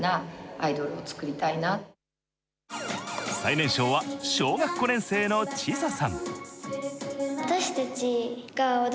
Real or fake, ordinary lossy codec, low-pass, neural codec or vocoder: real; none; none; none